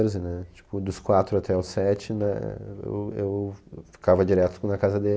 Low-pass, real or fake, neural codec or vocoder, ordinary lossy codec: none; real; none; none